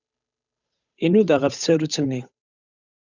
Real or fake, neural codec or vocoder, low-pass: fake; codec, 16 kHz, 8 kbps, FunCodec, trained on Chinese and English, 25 frames a second; 7.2 kHz